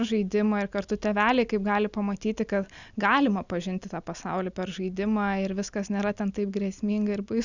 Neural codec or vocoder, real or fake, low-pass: none; real; 7.2 kHz